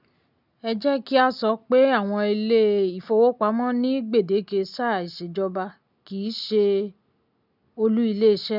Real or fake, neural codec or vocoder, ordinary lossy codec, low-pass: real; none; none; 5.4 kHz